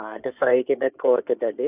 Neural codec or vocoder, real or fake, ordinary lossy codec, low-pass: codec, 16 kHz in and 24 kHz out, 2.2 kbps, FireRedTTS-2 codec; fake; AAC, 32 kbps; 3.6 kHz